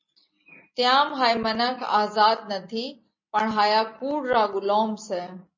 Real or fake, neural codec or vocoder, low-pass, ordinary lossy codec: real; none; 7.2 kHz; MP3, 32 kbps